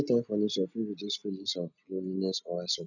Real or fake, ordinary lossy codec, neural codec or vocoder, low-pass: real; none; none; 7.2 kHz